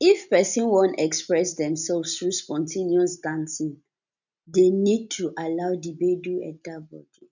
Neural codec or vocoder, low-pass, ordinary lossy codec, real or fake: none; 7.2 kHz; none; real